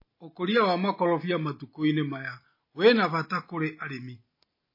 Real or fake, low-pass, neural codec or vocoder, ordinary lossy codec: real; 5.4 kHz; none; MP3, 24 kbps